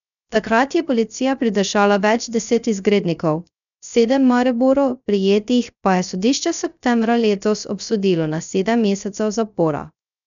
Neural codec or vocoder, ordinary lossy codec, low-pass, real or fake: codec, 16 kHz, 0.3 kbps, FocalCodec; none; 7.2 kHz; fake